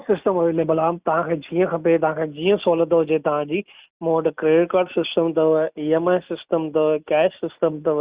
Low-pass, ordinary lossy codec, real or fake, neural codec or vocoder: 3.6 kHz; Opus, 64 kbps; real; none